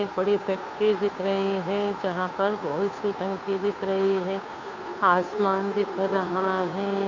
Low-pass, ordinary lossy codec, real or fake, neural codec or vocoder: 7.2 kHz; MP3, 64 kbps; fake; codec, 16 kHz, 2 kbps, FunCodec, trained on Chinese and English, 25 frames a second